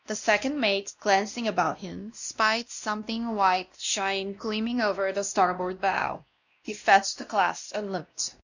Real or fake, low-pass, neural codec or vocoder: fake; 7.2 kHz; codec, 16 kHz, 1 kbps, X-Codec, WavLM features, trained on Multilingual LibriSpeech